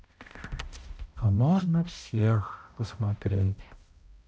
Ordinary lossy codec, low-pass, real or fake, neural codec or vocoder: none; none; fake; codec, 16 kHz, 0.5 kbps, X-Codec, HuBERT features, trained on balanced general audio